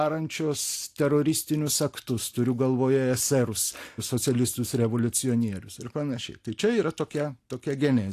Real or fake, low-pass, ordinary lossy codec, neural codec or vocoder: real; 14.4 kHz; AAC, 64 kbps; none